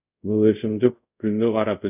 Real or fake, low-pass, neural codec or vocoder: fake; 3.6 kHz; codec, 24 kHz, 0.5 kbps, DualCodec